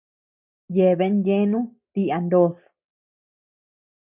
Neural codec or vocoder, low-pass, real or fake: none; 3.6 kHz; real